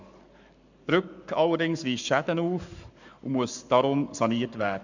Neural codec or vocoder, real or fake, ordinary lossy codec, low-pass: codec, 44.1 kHz, 7.8 kbps, Pupu-Codec; fake; none; 7.2 kHz